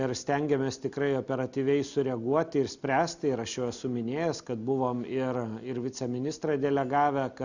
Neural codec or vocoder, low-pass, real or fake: none; 7.2 kHz; real